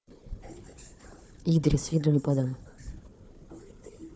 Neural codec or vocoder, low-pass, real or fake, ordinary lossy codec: codec, 16 kHz, 4 kbps, FunCodec, trained on Chinese and English, 50 frames a second; none; fake; none